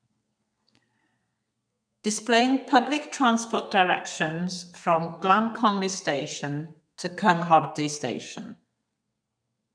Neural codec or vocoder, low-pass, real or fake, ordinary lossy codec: codec, 32 kHz, 1.9 kbps, SNAC; 9.9 kHz; fake; none